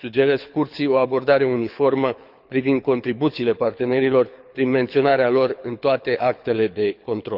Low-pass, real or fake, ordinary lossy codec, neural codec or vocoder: 5.4 kHz; fake; none; codec, 24 kHz, 6 kbps, HILCodec